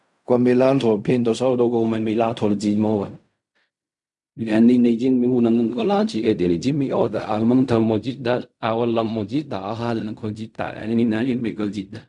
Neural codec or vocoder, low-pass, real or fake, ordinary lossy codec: codec, 16 kHz in and 24 kHz out, 0.4 kbps, LongCat-Audio-Codec, fine tuned four codebook decoder; 10.8 kHz; fake; none